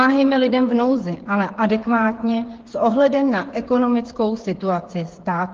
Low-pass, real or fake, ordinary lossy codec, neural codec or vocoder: 7.2 kHz; fake; Opus, 16 kbps; codec, 16 kHz, 8 kbps, FreqCodec, smaller model